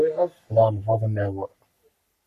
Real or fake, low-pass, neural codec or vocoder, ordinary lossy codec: fake; 14.4 kHz; codec, 44.1 kHz, 3.4 kbps, Pupu-Codec; AAC, 96 kbps